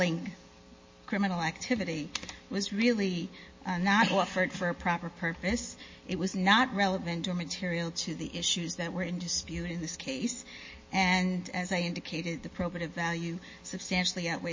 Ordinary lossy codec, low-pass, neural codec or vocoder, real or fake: MP3, 32 kbps; 7.2 kHz; none; real